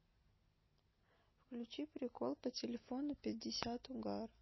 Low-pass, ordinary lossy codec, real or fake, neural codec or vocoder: 7.2 kHz; MP3, 24 kbps; real; none